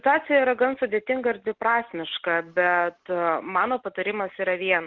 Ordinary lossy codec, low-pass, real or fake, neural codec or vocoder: Opus, 16 kbps; 7.2 kHz; real; none